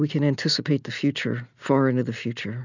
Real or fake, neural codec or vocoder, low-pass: real; none; 7.2 kHz